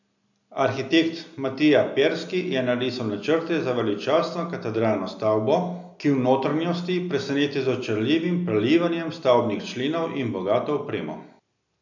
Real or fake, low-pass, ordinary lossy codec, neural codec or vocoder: real; 7.2 kHz; none; none